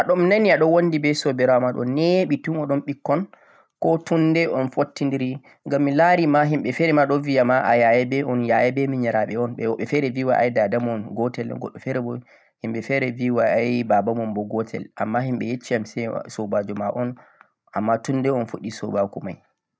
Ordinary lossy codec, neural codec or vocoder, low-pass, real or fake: none; none; none; real